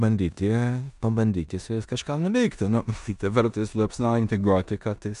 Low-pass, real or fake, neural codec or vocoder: 10.8 kHz; fake; codec, 16 kHz in and 24 kHz out, 0.9 kbps, LongCat-Audio-Codec, four codebook decoder